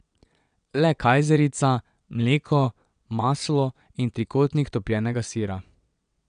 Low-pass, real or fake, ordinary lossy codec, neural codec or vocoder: 9.9 kHz; fake; none; vocoder, 22.05 kHz, 80 mel bands, WaveNeXt